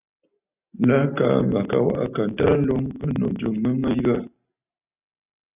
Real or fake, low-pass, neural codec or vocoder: real; 3.6 kHz; none